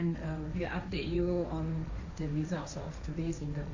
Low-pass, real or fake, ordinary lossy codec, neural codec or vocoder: 7.2 kHz; fake; AAC, 48 kbps; codec, 16 kHz, 1.1 kbps, Voila-Tokenizer